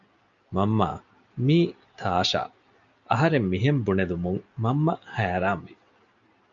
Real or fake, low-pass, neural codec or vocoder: real; 7.2 kHz; none